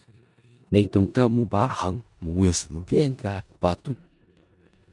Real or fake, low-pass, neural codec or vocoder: fake; 10.8 kHz; codec, 16 kHz in and 24 kHz out, 0.4 kbps, LongCat-Audio-Codec, four codebook decoder